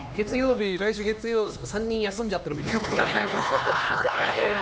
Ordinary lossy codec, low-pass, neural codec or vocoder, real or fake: none; none; codec, 16 kHz, 2 kbps, X-Codec, HuBERT features, trained on LibriSpeech; fake